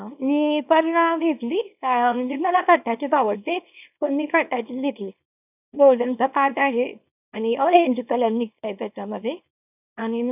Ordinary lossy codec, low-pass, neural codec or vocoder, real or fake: none; 3.6 kHz; codec, 24 kHz, 0.9 kbps, WavTokenizer, small release; fake